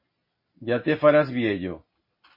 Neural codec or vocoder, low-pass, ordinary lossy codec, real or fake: none; 5.4 kHz; MP3, 24 kbps; real